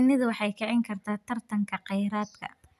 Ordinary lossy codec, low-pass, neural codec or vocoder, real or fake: none; 14.4 kHz; none; real